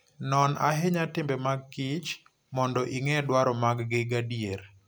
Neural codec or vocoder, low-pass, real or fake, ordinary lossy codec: none; none; real; none